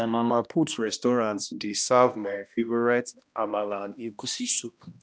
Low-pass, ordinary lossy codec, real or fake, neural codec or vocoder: none; none; fake; codec, 16 kHz, 1 kbps, X-Codec, HuBERT features, trained on balanced general audio